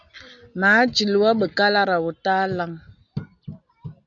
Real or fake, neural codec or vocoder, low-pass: real; none; 7.2 kHz